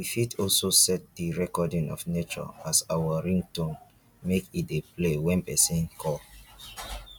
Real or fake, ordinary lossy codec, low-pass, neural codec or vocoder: real; none; 19.8 kHz; none